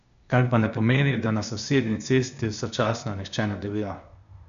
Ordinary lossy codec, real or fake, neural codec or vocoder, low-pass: none; fake; codec, 16 kHz, 0.8 kbps, ZipCodec; 7.2 kHz